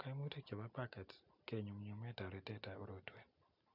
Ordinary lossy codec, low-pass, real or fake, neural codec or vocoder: none; 5.4 kHz; real; none